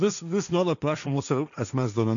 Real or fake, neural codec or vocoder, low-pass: fake; codec, 16 kHz, 1.1 kbps, Voila-Tokenizer; 7.2 kHz